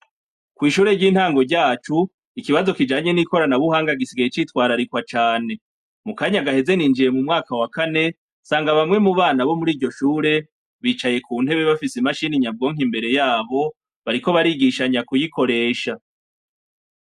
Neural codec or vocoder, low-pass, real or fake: none; 14.4 kHz; real